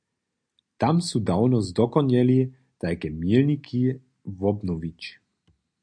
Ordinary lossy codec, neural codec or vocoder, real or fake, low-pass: MP3, 64 kbps; none; real; 9.9 kHz